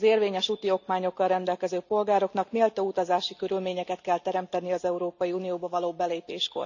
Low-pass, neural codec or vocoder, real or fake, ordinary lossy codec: 7.2 kHz; none; real; none